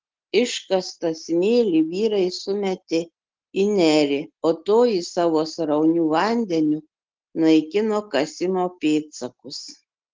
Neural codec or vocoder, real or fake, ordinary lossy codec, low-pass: none; real; Opus, 16 kbps; 7.2 kHz